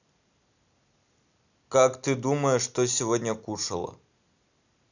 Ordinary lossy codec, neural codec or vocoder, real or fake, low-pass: none; none; real; 7.2 kHz